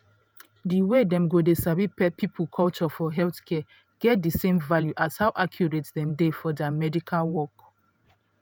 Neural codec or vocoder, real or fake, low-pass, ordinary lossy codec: vocoder, 48 kHz, 128 mel bands, Vocos; fake; none; none